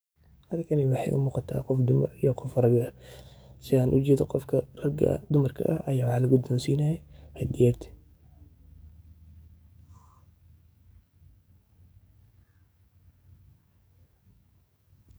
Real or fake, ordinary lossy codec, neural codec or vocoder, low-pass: fake; none; codec, 44.1 kHz, 7.8 kbps, DAC; none